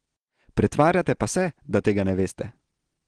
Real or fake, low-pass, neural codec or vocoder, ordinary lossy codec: real; 9.9 kHz; none; Opus, 16 kbps